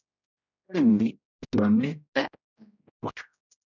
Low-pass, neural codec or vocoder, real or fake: 7.2 kHz; codec, 16 kHz, 0.5 kbps, X-Codec, HuBERT features, trained on general audio; fake